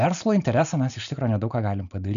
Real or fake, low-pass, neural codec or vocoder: real; 7.2 kHz; none